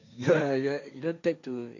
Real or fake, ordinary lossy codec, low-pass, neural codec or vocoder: fake; none; 7.2 kHz; codec, 16 kHz, 1.1 kbps, Voila-Tokenizer